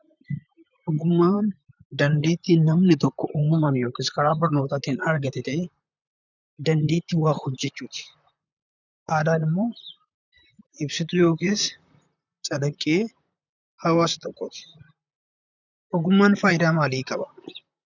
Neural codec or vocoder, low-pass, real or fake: vocoder, 44.1 kHz, 128 mel bands, Pupu-Vocoder; 7.2 kHz; fake